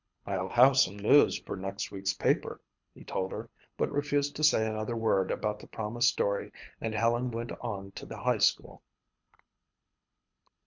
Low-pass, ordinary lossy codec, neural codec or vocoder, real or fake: 7.2 kHz; MP3, 64 kbps; codec, 24 kHz, 6 kbps, HILCodec; fake